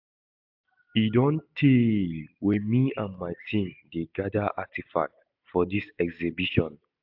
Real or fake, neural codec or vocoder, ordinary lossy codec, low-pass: real; none; AAC, 48 kbps; 5.4 kHz